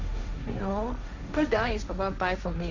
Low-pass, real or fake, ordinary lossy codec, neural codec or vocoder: 7.2 kHz; fake; none; codec, 16 kHz, 1.1 kbps, Voila-Tokenizer